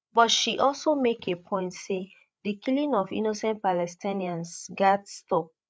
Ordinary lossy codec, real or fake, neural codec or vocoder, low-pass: none; fake; codec, 16 kHz, 8 kbps, FreqCodec, larger model; none